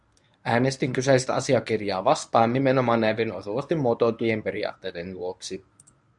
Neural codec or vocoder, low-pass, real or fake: codec, 24 kHz, 0.9 kbps, WavTokenizer, medium speech release version 1; 10.8 kHz; fake